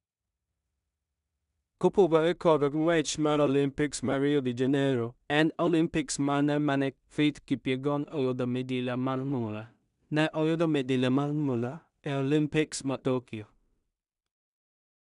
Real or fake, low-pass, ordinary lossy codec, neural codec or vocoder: fake; 10.8 kHz; none; codec, 16 kHz in and 24 kHz out, 0.4 kbps, LongCat-Audio-Codec, two codebook decoder